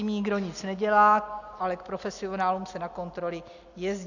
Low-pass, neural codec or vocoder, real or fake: 7.2 kHz; none; real